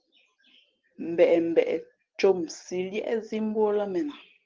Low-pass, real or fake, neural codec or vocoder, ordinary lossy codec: 7.2 kHz; real; none; Opus, 16 kbps